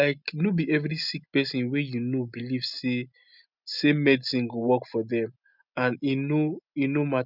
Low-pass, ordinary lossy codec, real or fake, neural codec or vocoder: 5.4 kHz; none; real; none